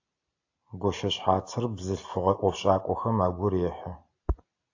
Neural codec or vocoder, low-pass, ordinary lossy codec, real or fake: none; 7.2 kHz; AAC, 48 kbps; real